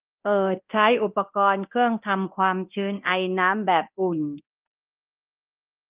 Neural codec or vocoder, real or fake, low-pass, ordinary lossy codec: codec, 24 kHz, 0.9 kbps, DualCodec; fake; 3.6 kHz; Opus, 24 kbps